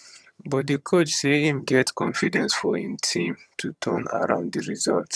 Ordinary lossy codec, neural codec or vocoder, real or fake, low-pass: none; vocoder, 22.05 kHz, 80 mel bands, HiFi-GAN; fake; none